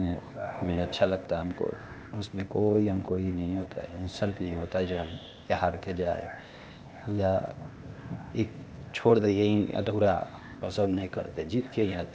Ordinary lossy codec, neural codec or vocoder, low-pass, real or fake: none; codec, 16 kHz, 0.8 kbps, ZipCodec; none; fake